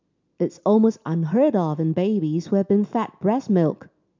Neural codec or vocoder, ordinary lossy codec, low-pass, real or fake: none; MP3, 64 kbps; 7.2 kHz; real